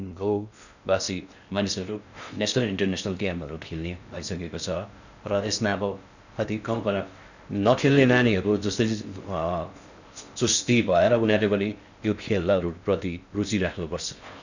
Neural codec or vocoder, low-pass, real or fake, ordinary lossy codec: codec, 16 kHz in and 24 kHz out, 0.6 kbps, FocalCodec, streaming, 2048 codes; 7.2 kHz; fake; none